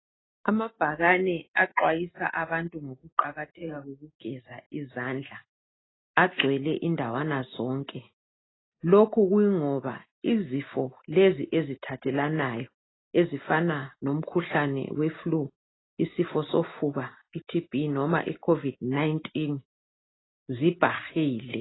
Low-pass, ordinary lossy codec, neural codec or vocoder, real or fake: 7.2 kHz; AAC, 16 kbps; none; real